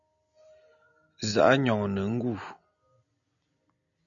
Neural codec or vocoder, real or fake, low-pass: none; real; 7.2 kHz